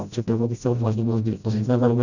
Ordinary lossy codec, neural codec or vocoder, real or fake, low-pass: none; codec, 16 kHz, 0.5 kbps, FreqCodec, smaller model; fake; 7.2 kHz